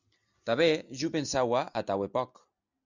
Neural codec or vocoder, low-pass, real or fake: none; 7.2 kHz; real